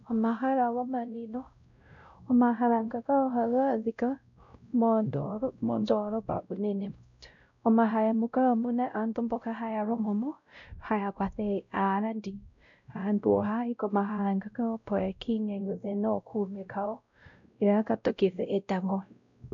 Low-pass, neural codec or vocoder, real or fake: 7.2 kHz; codec, 16 kHz, 0.5 kbps, X-Codec, WavLM features, trained on Multilingual LibriSpeech; fake